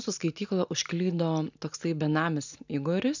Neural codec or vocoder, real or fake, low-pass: none; real; 7.2 kHz